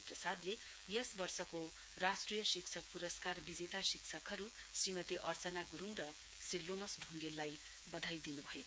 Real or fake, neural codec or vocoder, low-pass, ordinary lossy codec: fake; codec, 16 kHz, 4 kbps, FreqCodec, smaller model; none; none